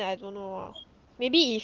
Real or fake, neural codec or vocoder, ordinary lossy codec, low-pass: real; none; Opus, 16 kbps; 7.2 kHz